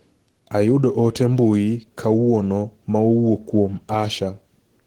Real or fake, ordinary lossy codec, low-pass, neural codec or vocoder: fake; Opus, 16 kbps; 19.8 kHz; codec, 44.1 kHz, 7.8 kbps, Pupu-Codec